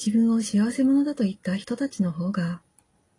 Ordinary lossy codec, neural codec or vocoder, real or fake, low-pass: AAC, 32 kbps; none; real; 10.8 kHz